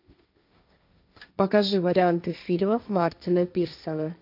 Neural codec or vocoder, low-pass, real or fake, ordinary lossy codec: codec, 16 kHz, 1.1 kbps, Voila-Tokenizer; 5.4 kHz; fake; AAC, 48 kbps